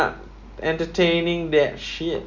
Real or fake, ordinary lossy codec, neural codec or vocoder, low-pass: real; none; none; 7.2 kHz